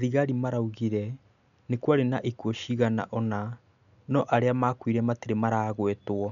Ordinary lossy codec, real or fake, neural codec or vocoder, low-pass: none; real; none; 7.2 kHz